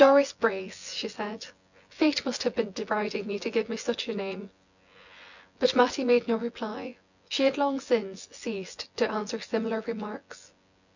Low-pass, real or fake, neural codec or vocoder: 7.2 kHz; fake; vocoder, 24 kHz, 100 mel bands, Vocos